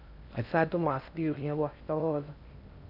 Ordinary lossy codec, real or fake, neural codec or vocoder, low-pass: none; fake; codec, 16 kHz in and 24 kHz out, 0.6 kbps, FocalCodec, streaming, 4096 codes; 5.4 kHz